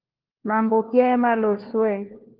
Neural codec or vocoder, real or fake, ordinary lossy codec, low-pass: codec, 16 kHz, 1 kbps, FunCodec, trained on LibriTTS, 50 frames a second; fake; Opus, 16 kbps; 5.4 kHz